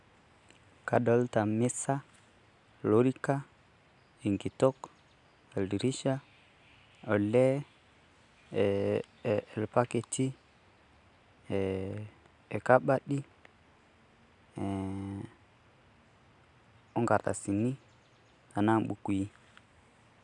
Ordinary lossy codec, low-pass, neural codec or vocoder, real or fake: none; 10.8 kHz; none; real